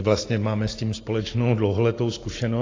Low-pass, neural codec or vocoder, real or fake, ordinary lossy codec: 7.2 kHz; none; real; AAC, 32 kbps